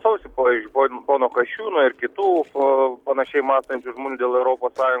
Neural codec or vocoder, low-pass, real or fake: none; 19.8 kHz; real